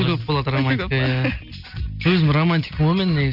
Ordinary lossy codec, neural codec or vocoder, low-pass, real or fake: none; none; 5.4 kHz; real